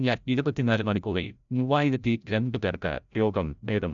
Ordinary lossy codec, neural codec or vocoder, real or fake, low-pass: none; codec, 16 kHz, 0.5 kbps, FreqCodec, larger model; fake; 7.2 kHz